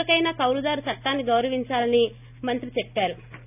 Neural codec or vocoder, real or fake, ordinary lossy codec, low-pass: none; real; none; 3.6 kHz